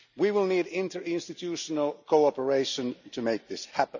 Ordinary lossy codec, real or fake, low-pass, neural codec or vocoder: none; real; 7.2 kHz; none